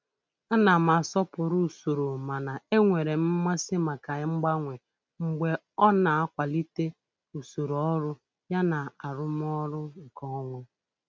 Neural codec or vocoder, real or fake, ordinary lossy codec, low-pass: none; real; none; none